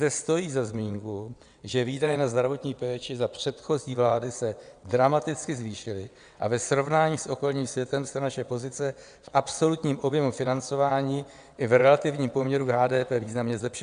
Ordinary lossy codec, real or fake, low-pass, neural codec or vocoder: AAC, 96 kbps; fake; 9.9 kHz; vocoder, 22.05 kHz, 80 mel bands, WaveNeXt